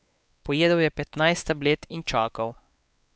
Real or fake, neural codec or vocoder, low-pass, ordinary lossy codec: fake; codec, 16 kHz, 4 kbps, X-Codec, WavLM features, trained on Multilingual LibriSpeech; none; none